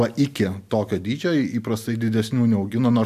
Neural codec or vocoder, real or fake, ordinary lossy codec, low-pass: none; real; MP3, 96 kbps; 14.4 kHz